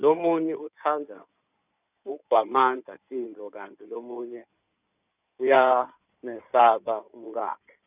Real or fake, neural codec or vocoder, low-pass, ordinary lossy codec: fake; codec, 16 kHz in and 24 kHz out, 2.2 kbps, FireRedTTS-2 codec; 3.6 kHz; none